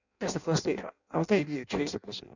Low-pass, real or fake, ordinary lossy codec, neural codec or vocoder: 7.2 kHz; fake; none; codec, 16 kHz in and 24 kHz out, 0.6 kbps, FireRedTTS-2 codec